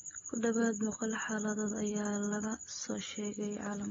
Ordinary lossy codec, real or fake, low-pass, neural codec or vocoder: AAC, 24 kbps; real; 7.2 kHz; none